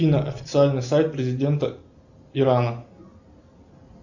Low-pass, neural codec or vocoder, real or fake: 7.2 kHz; none; real